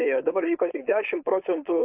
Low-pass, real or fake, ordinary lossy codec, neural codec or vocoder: 3.6 kHz; fake; AAC, 32 kbps; codec, 16 kHz, 4.8 kbps, FACodec